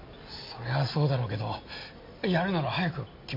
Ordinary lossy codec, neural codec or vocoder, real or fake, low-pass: MP3, 32 kbps; none; real; 5.4 kHz